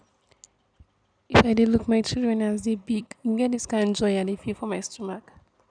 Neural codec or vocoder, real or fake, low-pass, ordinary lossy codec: none; real; 9.9 kHz; none